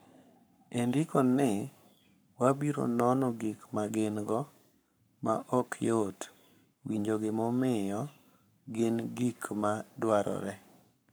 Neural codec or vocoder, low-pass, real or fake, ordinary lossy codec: codec, 44.1 kHz, 7.8 kbps, Pupu-Codec; none; fake; none